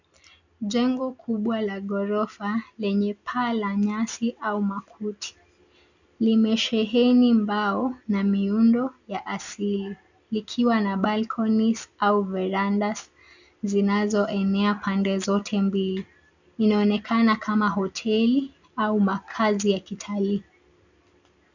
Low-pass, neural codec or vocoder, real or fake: 7.2 kHz; none; real